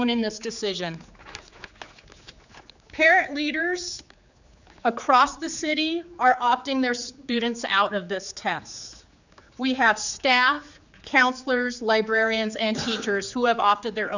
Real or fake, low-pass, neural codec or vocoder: fake; 7.2 kHz; codec, 16 kHz, 4 kbps, X-Codec, HuBERT features, trained on general audio